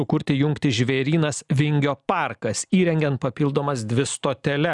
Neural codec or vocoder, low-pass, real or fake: none; 10.8 kHz; real